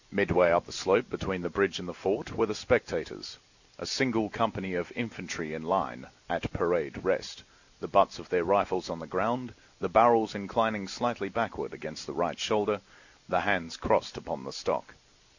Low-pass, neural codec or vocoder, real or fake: 7.2 kHz; none; real